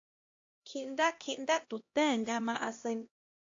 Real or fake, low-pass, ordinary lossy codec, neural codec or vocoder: fake; 7.2 kHz; AAC, 48 kbps; codec, 16 kHz, 1 kbps, X-Codec, HuBERT features, trained on LibriSpeech